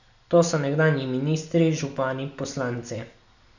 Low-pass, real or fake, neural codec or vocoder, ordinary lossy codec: 7.2 kHz; real; none; none